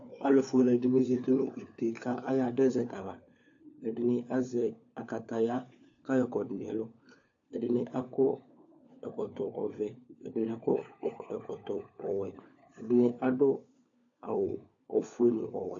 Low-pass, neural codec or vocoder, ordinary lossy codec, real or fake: 7.2 kHz; codec, 16 kHz, 4 kbps, FunCodec, trained on LibriTTS, 50 frames a second; AAC, 64 kbps; fake